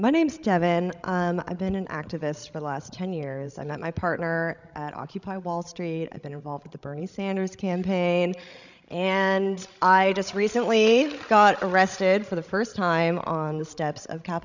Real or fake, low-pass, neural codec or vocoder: fake; 7.2 kHz; codec, 16 kHz, 16 kbps, FreqCodec, larger model